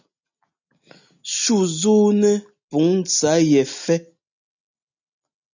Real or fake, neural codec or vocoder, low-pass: real; none; 7.2 kHz